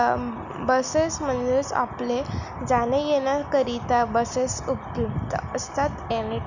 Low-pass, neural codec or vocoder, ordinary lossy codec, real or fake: 7.2 kHz; none; none; real